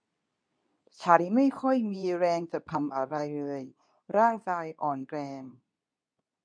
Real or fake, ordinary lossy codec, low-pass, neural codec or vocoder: fake; none; 9.9 kHz; codec, 24 kHz, 0.9 kbps, WavTokenizer, medium speech release version 2